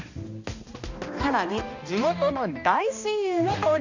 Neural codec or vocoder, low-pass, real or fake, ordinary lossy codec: codec, 16 kHz, 1 kbps, X-Codec, HuBERT features, trained on balanced general audio; 7.2 kHz; fake; Opus, 64 kbps